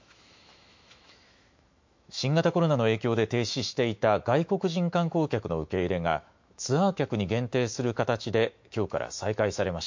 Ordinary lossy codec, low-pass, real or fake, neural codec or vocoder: MP3, 48 kbps; 7.2 kHz; fake; autoencoder, 48 kHz, 128 numbers a frame, DAC-VAE, trained on Japanese speech